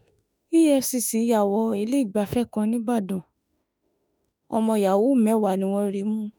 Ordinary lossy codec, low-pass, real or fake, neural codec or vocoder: none; none; fake; autoencoder, 48 kHz, 32 numbers a frame, DAC-VAE, trained on Japanese speech